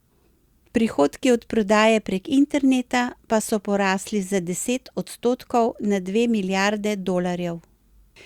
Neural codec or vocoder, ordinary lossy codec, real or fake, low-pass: none; Opus, 64 kbps; real; 19.8 kHz